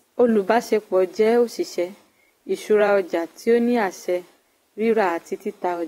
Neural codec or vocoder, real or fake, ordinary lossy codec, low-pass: vocoder, 44.1 kHz, 128 mel bands every 512 samples, BigVGAN v2; fake; AAC, 48 kbps; 19.8 kHz